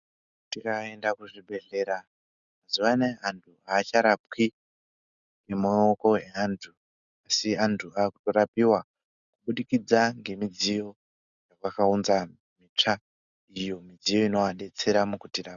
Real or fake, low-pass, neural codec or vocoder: real; 7.2 kHz; none